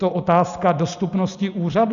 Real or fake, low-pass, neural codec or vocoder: real; 7.2 kHz; none